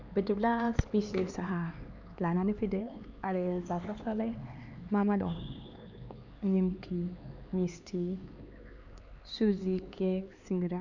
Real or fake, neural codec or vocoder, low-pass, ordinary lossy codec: fake; codec, 16 kHz, 4 kbps, X-Codec, HuBERT features, trained on LibriSpeech; 7.2 kHz; none